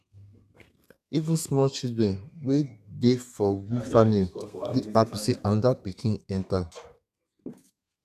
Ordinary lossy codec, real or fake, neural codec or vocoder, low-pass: AAC, 96 kbps; fake; codec, 32 kHz, 1.9 kbps, SNAC; 14.4 kHz